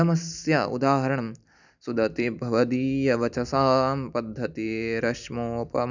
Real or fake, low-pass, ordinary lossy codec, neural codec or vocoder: real; 7.2 kHz; none; none